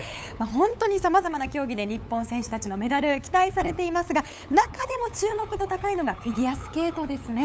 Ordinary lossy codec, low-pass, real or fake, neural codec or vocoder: none; none; fake; codec, 16 kHz, 8 kbps, FunCodec, trained on LibriTTS, 25 frames a second